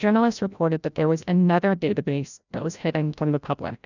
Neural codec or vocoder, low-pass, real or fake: codec, 16 kHz, 0.5 kbps, FreqCodec, larger model; 7.2 kHz; fake